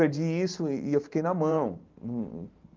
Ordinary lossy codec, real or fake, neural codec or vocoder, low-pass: Opus, 32 kbps; fake; vocoder, 44.1 kHz, 128 mel bands every 512 samples, BigVGAN v2; 7.2 kHz